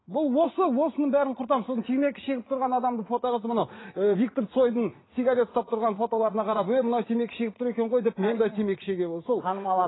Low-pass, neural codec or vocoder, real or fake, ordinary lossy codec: 7.2 kHz; vocoder, 44.1 kHz, 80 mel bands, Vocos; fake; AAC, 16 kbps